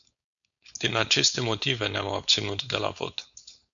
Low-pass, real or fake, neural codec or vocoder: 7.2 kHz; fake; codec, 16 kHz, 4.8 kbps, FACodec